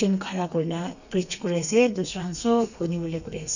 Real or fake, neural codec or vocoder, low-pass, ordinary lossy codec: fake; codec, 32 kHz, 1.9 kbps, SNAC; 7.2 kHz; none